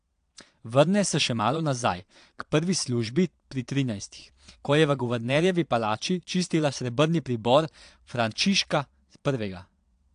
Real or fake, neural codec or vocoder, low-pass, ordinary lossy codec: fake; vocoder, 22.05 kHz, 80 mel bands, Vocos; 9.9 kHz; AAC, 64 kbps